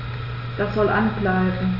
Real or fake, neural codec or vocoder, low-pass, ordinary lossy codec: real; none; 5.4 kHz; none